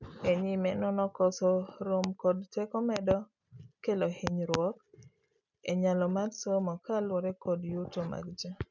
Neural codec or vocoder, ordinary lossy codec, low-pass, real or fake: none; none; 7.2 kHz; real